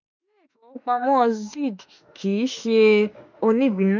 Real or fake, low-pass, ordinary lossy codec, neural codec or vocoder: fake; 7.2 kHz; none; autoencoder, 48 kHz, 32 numbers a frame, DAC-VAE, trained on Japanese speech